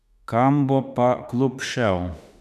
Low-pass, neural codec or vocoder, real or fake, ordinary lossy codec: 14.4 kHz; autoencoder, 48 kHz, 32 numbers a frame, DAC-VAE, trained on Japanese speech; fake; none